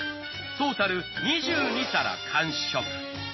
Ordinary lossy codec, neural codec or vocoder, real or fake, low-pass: MP3, 24 kbps; none; real; 7.2 kHz